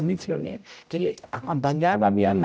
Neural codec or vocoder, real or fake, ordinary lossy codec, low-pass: codec, 16 kHz, 0.5 kbps, X-Codec, HuBERT features, trained on general audio; fake; none; none